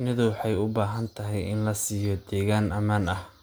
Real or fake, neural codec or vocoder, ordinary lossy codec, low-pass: real; none; none; none